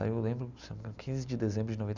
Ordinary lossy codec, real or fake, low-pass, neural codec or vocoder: none; real; 7.2 kHz; none